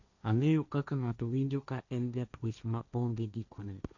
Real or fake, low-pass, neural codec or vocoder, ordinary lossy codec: fake; 7.2 kHz; codec, 16 kHz, 1.1 kbps, Voila-Tokenizer; none